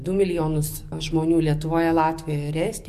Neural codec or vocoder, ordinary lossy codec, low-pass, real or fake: codec, 44.1 kHz, 7.8 kbps, DAC; MP3, 64 kbps; 14.4 kHz; fake